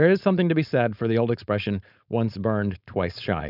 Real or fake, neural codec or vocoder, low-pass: fake; codec, 16 kHz, 4.8 kbps, FACodec; 5.4 kHz